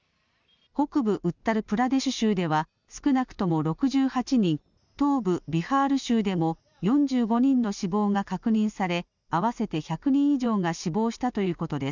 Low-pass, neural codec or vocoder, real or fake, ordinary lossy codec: 7.2 kHz; none; real; none